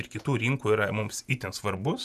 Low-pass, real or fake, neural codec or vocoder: 14.4 kHz; fake; vocoder, 48 kHz, 128 mel bands, Vocos